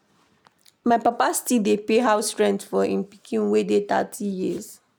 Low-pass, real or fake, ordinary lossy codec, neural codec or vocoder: none; real; none; none